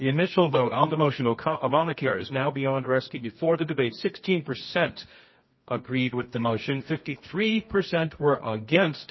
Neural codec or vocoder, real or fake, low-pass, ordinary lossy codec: codec, 24 kHz, 0.9 kbps, WavTokenizer, medium music audio release; fake; 7.2 kHz; MP3, 24 kbps